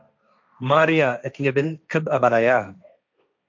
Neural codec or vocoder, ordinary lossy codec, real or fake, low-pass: codec, 16 kHz, 1.1 kbps, Voila-Tokenizer; MP3, 64 kbps; fake; 7.2 kHz